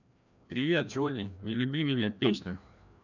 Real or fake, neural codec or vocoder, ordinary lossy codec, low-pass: fake; codec, 16 kHz, 1 kbps, FreqCodec, larger model; none; 7.2 kHz